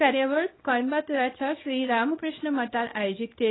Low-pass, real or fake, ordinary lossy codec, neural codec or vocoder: 7.2 kHz; fake; AAC, 16 kbps; codec, 24 kHz, 0.9 kbps, WavTokenizer, small release